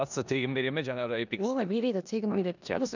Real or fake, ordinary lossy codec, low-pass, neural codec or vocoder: fake; none; 7.2 kHz; codec, 16 kHz in and 24 kHz out, 0.9 kbps, LongCat-Audio-Codec, four codebook decoder